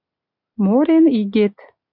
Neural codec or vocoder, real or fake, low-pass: none; real; 5.4 kHz